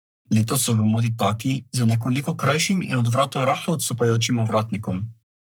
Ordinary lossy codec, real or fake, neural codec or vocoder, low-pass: none; fake; codec, 44.1 kHz, 3.4 kbps, Pupu-Codec; none